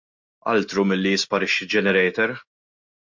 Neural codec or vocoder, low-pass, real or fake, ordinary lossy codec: none; 7.2 kHz; real; MP3, 48 kbps